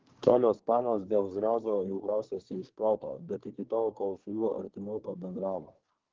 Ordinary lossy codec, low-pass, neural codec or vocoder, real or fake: Opus, 16 kbps; 7.2 kHz; codec, 24 kHz, 1 kbps, SNAC; fake